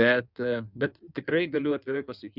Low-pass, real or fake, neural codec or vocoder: 5.4 kHz; fake; codec, 16 kHz in and 24 kHz out, 1.1 kbps, FireRedTTS-2 codec